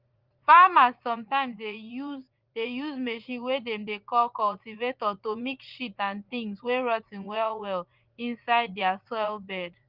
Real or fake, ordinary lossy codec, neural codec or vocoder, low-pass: fake; Opus, 32 kbps; vocoder, 44.1 kHz, 80 mel bands, Vocos; 5.4 kHz